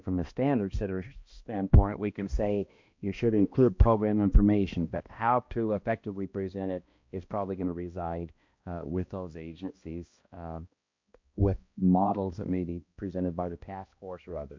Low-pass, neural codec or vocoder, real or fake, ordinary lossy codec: 7.2 kHz; codec, 16 kHz, 1 kbps, X-Codec, HuBERT features, trained on balanced general audio; fake; MP3, 48 kbps